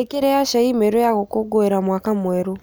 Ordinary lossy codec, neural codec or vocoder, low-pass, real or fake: none; none; none; real